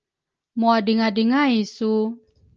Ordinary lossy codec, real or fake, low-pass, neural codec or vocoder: Opus, 24 kbps; real; 7.2 kHz; none